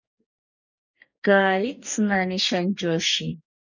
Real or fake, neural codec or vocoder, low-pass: fake; codec, 44.1 kHz, 2.6 kbps, DAC; 7.2 kHz